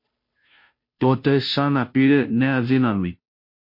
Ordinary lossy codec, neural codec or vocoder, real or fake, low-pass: MP3, 32 kbps; codec, 16 kHz, 0.5 kbps, FunCodec, trained on Chinese and English, 25 frames a second; fake; 5.4 kHz